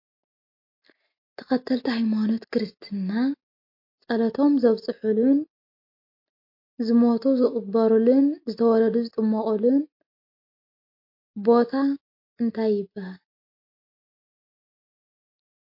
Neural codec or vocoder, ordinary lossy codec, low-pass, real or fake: none; MP3, 32 kbps; 5.4 kHz; real